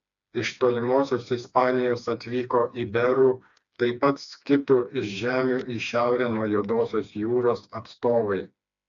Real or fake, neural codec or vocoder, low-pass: fake; codec, 16 kHz, 2 kbps, FreqCodec, smaller model; 7.2 kHz